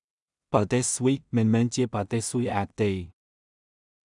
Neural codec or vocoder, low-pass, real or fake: codec, 16 kHz in and 24 kHz out, 0.4 kbps, LongCat-Audio-Codec, two codebook decoder; 10.8 kHz; fake